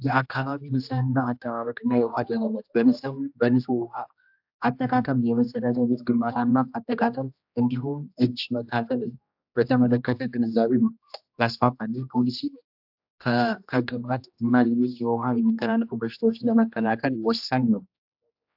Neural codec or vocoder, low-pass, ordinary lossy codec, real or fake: codec, 16 kHz, 1 kbps, X-Codec, HuBERT features, trained on general audio; 5.4 kHz; AAC, 48 kbps; fake